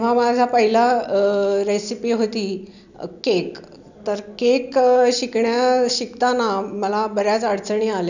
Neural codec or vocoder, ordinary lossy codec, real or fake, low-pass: none; none; real; 7.2 kHz